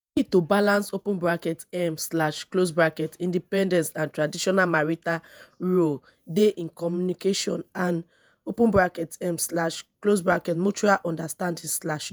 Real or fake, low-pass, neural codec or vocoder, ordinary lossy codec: fake; none; vocoder, 48 kHz, 128 mel bands, Vocos; none